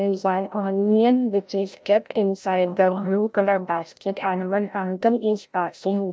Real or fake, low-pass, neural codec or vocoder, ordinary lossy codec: fake; none; codec, 16 kHz, 0.5 kbps, FreqCodec, larger model; none